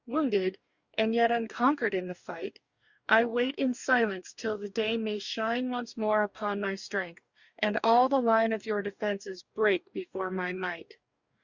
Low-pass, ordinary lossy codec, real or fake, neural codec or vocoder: 7.2 kHz; Opus, 64 kbps; fake; codec, 44.1 kHz, 2.6 kbps, DAC